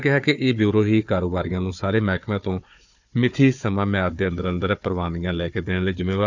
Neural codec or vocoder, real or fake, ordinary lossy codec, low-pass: codec, 16 kHz, 4 kbps, FunCodec, trained on Chinese and English, 50 frames a second; fake; none; 7.2 kHz